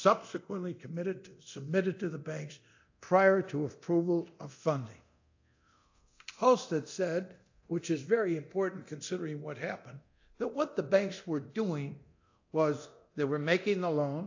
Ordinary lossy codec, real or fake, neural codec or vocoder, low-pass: AAC, 48 kbps; fake; codec, 24 kHz, 0.9 kbps, DualCodec; 7.2 kHz